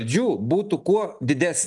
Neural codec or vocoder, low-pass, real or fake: none; 10.8 kHz; real